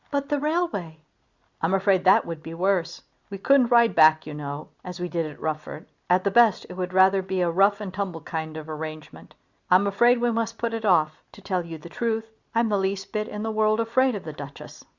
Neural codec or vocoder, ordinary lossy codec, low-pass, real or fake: none; Opus, 64 kbps; 7.2 kHz; real